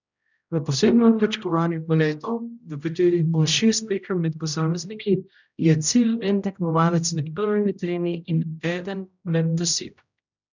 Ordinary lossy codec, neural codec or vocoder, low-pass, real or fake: none; codec, 16 kHz, 0.5 kbps, X-Codec, HuBERT features, trained on general audio; 7.2 kHz; fake